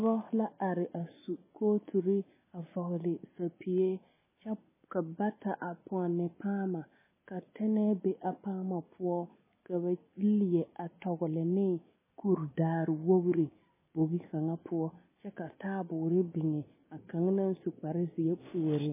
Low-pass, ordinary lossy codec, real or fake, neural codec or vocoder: 3.6 kHz; MP3, 16 kbps; real; none